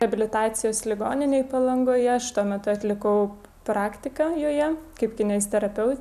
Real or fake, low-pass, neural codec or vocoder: real; 14.4 kHz; none